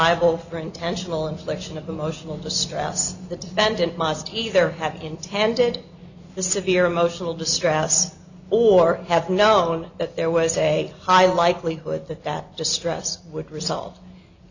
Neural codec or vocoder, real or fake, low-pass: none; real; 7.2 kHz